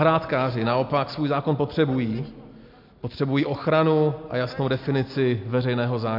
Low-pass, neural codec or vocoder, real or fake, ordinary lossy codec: 5.4 kHz; none; real; MP3, 48 kbps